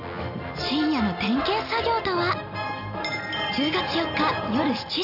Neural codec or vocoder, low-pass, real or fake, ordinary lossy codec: none; 5.4 kHz; real; none